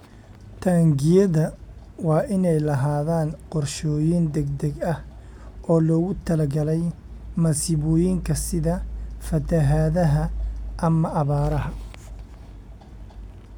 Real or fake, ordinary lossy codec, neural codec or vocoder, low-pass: real; none; none; 19.8 kHz